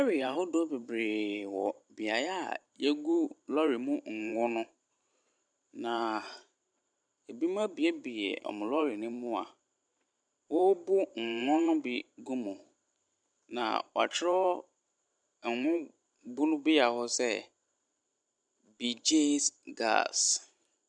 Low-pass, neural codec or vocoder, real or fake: 9.9 kHz; vocoder, 44.1 kHz, 128 mel bands every 512 samples, BigVGAN v2; fake